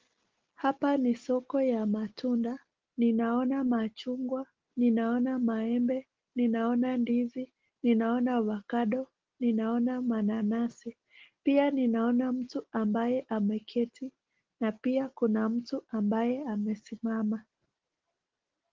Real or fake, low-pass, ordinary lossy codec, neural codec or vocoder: real; 7.2 kHz; Opus, 16 kbps; none